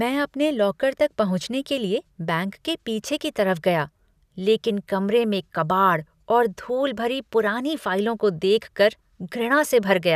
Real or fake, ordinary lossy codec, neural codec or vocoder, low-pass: real; none; none; 14.4 kHz